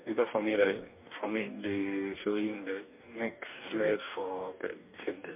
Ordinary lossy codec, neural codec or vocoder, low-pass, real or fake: MP3, 32 kbps; codec, 44.1 kHz, 2.6 kbps, DAC; 3.6 kHz; fake